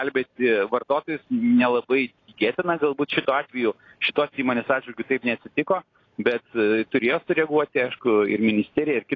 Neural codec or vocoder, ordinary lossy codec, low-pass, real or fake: none; AAC, 32 kbps; 7.2 kHz; real